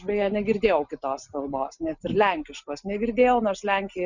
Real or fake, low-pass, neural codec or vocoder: real; 7.2 kHz; none